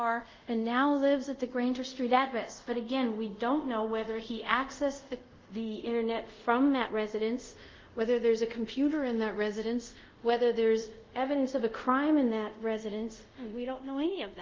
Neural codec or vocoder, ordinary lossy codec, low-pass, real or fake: codec, 24 kHz, 0.5 kbps, DualCodec; Opus, 32 kbps; 7.2 kHz; fake